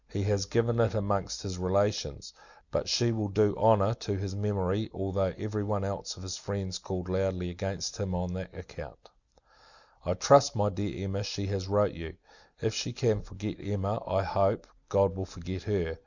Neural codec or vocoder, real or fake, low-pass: none; real; 7.2 kHz